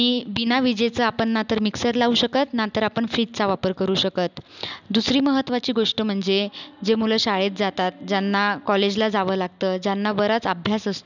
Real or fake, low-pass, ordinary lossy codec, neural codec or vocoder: real; 7.2 kHz; none; none